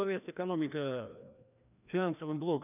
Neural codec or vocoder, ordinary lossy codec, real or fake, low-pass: codec, 16 kHz, 1 kbps, FreqCodec, larger model; none; fake; 3.6 kHz